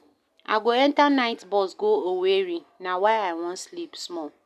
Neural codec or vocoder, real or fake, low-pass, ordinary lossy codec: none; real; 14.4 kHz; none